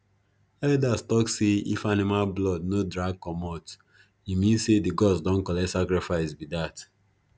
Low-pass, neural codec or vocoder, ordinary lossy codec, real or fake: none; none; none; real